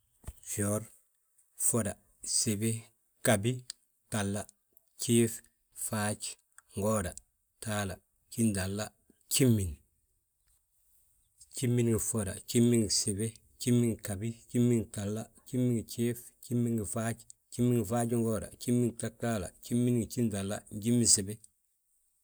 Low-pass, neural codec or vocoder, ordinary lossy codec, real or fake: none; none; none; real